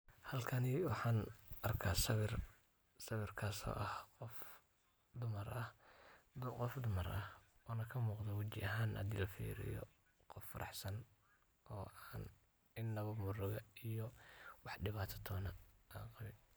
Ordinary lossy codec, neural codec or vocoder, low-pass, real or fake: none; none; none; real